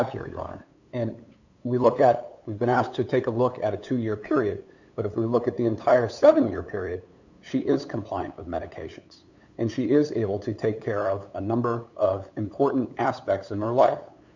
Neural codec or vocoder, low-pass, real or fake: codec, 16 kHz, 8 kbps, FunCodec, trained on LibriTTS, 25 frames a second; 7.2 kHz; fake